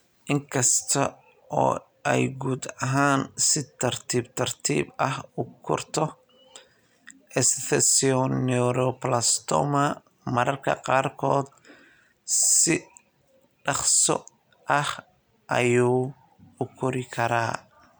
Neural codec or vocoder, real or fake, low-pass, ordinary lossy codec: none; real; none; none